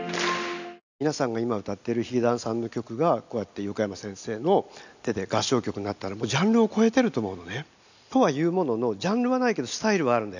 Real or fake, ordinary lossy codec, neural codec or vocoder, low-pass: real; none; none; 7.2 kHz